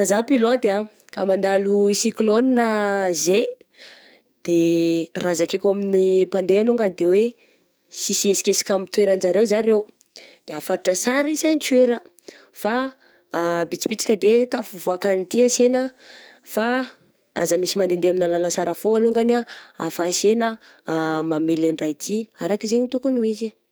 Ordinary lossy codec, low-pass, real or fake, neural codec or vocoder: none; none; fake; codec, 44.1 kHz, 2.6 kbps, SNAC